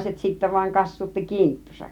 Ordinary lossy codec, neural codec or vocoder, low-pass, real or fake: none; none; 19.8 kHz; real